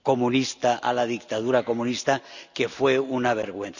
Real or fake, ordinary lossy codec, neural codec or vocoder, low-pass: real; none; none; 7.2 kHz